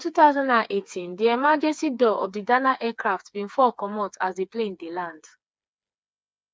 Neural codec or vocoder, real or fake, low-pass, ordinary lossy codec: codec, 16 kHz, 4 kbps, FreqCodec, smaller model; fake; none; none